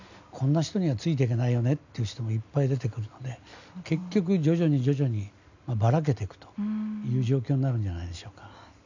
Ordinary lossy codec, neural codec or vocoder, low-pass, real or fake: none; none; 7.2 kHz; real